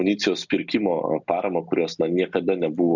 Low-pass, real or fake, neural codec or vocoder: 7.2 kHz; real; none